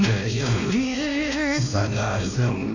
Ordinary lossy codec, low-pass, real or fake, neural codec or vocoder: none; 7.2 kHz; fake; codec, 16 kHz, 1 kbps, X-Codec, WavLM features, trained on Multilingual LibriSpeech